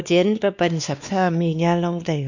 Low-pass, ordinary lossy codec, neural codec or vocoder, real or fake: 7.2 kHz; none; codec, 16 kHz, 2 kbps, X-Codec, WavLM features, trained on Multilingual LibriSpeech; fake